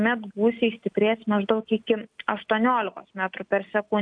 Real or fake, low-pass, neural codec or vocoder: real; 9.9 kHz; none